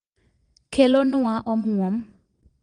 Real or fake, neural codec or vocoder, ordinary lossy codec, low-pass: fake; vocoder, 22.05 kHz, 80 mel bands, Vocos; Opus, 24 kbps; 9.9 kHz